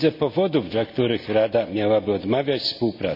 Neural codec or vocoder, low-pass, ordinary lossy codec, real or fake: none; 5.4 kHz; none; real